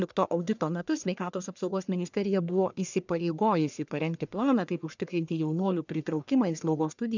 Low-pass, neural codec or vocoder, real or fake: 7.2 kHz; codec, 44.1 kHz, 1.7 kbps, Pupu-Codec; fake